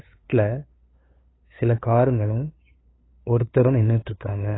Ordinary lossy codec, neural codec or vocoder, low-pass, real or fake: AAC, 16 kbps; codec, 16 kHz, 2 kbps, FunCodec, trained on LibriTTS, 25 frames a second; 7.2 kHz; fake